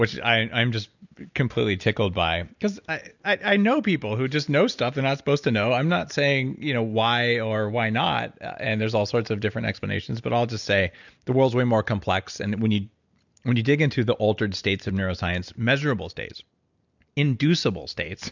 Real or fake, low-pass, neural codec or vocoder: real; 7.2 kHz; none